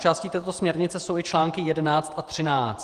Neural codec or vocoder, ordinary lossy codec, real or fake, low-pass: vocoder, 48 kHz, 128 mel bands, Vocos; Opus, 24 kbps; fake; 14.4 kHz